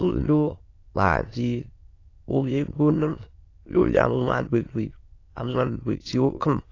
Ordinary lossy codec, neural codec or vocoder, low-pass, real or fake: AAC, 32 kbps; autoencoder, 22.05 kHz, a latent of 192 numbers a frame, VITS, trained on many speakers; 7.2 kHz; fake